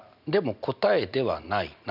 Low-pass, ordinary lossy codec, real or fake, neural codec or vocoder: 5.4 kHz; none; real; none